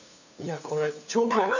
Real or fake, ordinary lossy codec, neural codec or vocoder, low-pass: fake; none; codec, 16 kHz, 2 kbps, FunCodec, trained on LibriTTS, 25 frames a second; 7.2 kHz